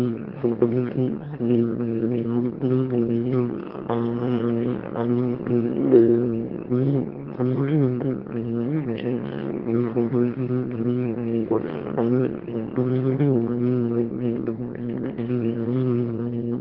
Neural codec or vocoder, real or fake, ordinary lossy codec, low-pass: autoencoder, 22.05 kHz, a latent of 192 numbers a frame, VITS, trained on one speaker; fake; Opus, 16 kbps; 5.4 kHz